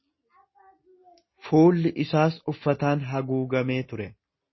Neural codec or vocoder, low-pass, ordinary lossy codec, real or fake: none; 7.2 kHz; MP3, 24 kbps; real